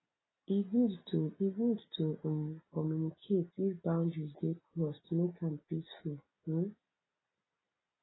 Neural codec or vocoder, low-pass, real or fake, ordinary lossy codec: none; 7.2 kHz; real; AAC, 16 kbps